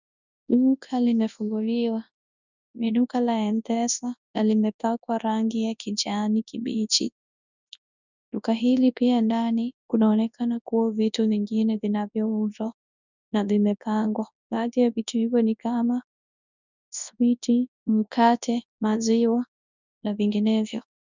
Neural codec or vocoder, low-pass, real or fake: codec, 24 kHz, 0.9 kbps, WavTokenizer, large speech release; 7.2 kHz; fake